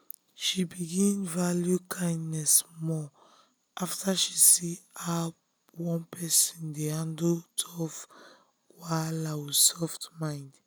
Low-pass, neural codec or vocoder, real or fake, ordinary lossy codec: none; none; real; none